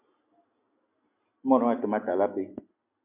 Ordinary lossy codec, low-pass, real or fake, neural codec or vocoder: MP3, 32 kbps; 3.6 kHz; real; none